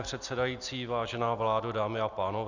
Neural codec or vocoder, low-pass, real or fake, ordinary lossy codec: none; 7.2 kHz; real; Opus, 64 kbps